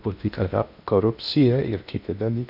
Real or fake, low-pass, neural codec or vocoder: fake; 5.4 kHz; codec, 16 kHz in and 24 kHz out, 0.8 kbps, FocalCodec, streaming, 65536 codes